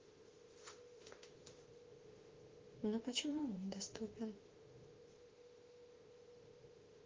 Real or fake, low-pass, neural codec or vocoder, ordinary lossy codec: fake; 7.2 kHz; autoencoder, 48 kHz, 32 numbers a frame, DAC-VAE, trained on Japanese speech; Opus, 16 kbps